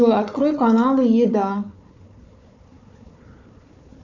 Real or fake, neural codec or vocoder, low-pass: fake; codec, 16 kHz, 4 kbps, FunCodec, trained on Chinese and English, 50 frames a second; 7.2 kHz